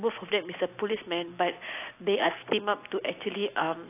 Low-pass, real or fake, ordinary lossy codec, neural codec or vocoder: 3.6 kHz; real; AAC, 24 kbps; none